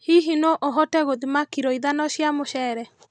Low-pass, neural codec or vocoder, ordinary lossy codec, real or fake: none; none; none; real